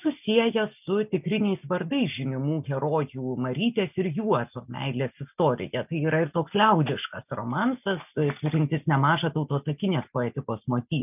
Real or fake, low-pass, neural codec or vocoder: real; 3.6 kHz; none